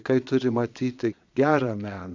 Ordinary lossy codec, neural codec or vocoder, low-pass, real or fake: MP3, 64 kbps; vocoder, 22.05 kHz, 80 mel bands, Vocos; 7.2 kHz; fake